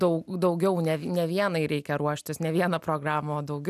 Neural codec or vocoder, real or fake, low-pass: none; real; 14.4 kHz